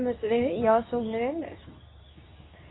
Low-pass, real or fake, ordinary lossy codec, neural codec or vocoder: 7.2 kHz; fake; AAC, 16 kbps; codec, 24 kHz, 0.9 kbps, WavTokenizer, medium speech release version 2